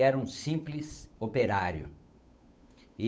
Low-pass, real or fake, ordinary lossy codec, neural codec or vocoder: none; fake; none; codec, 16 kHz, 8 kbps, FunCodec, trained on Chinese and English, 25 frames a second